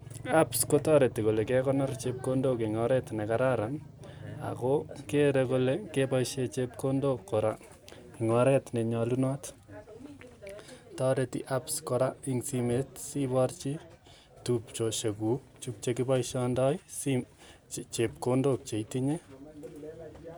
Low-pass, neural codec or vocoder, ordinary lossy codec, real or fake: none; none; none; real